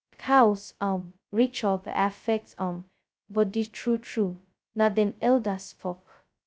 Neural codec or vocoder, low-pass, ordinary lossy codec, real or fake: codec, 16 kHz, 0.2 kbps, FocalCodec; none; none; fake